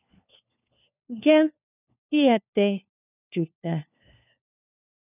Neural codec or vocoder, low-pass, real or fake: codec, 16 kHz, 1 kbps, FunCodec, trained on LibriTTS, 50 frames a second; 3.6 kHz; fake